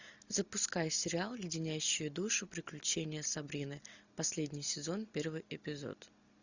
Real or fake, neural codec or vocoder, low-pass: real; none; 7.2 kHz